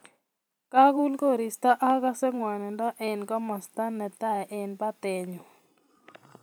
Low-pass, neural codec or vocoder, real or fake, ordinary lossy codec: none; vocoder, 44.1 kHz, 128 mel bands every 512 samples, BigVGAN v2; fake; none